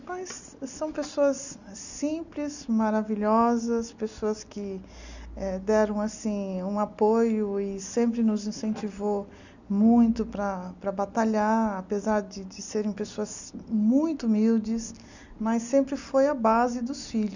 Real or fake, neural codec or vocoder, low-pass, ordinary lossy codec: real; none; 7.2 kHz; none